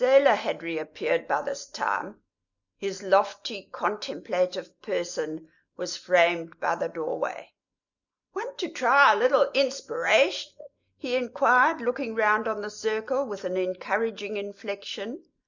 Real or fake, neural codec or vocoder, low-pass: real; none; 7.2 kHz